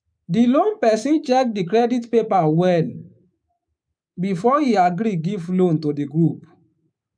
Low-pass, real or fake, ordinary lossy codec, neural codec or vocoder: 9.9 kHz; fake; none; codec, 24 kHz, 3.1 kbps, DualCodec